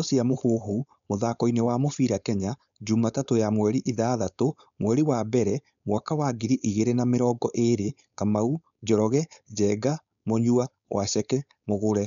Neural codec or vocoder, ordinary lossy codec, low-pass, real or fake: codec, 16 kHz, 4.8 kbps, FACodec; none; 7.2 kHz; fake